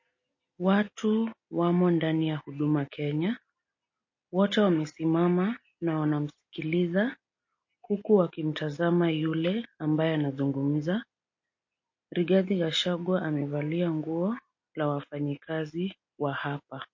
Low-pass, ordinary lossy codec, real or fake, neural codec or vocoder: 7.2 kHz; MP3, 32 kbps; real; none